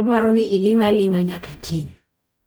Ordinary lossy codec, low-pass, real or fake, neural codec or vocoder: none; none; fake; codec, 44.1 kHz, 0.9 kbps, DAC